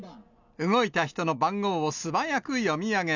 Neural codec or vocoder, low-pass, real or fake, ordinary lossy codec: none; 7.2 kHz; real; none